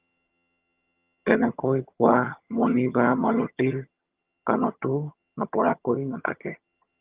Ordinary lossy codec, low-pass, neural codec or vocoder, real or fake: Opus, 32 kbps; 3.6 kHz; vocoder, 22.05 kHz, 80 mel bands, HiFi-GAN; fake